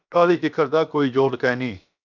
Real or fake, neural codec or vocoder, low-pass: fake; codec, 16 kHz, 0.7 kbps, FocalCodec; 7.2 kHz